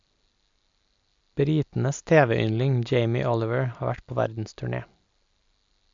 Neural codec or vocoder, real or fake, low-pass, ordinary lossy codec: none; real; 7.2 kHz; AAC, 64 kbps